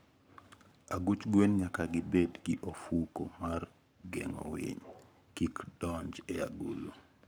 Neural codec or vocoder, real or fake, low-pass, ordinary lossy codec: codec, 44.1 kHz, 7.8 kbps, Pupu-Codec; fake; none; none